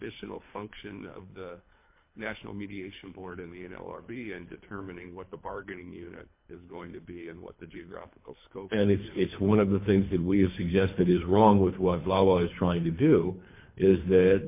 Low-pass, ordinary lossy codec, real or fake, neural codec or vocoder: 3.6 kHz; MP3, 24 kbps; fake; codec, 24 kHz, 3 kbps, HILCodec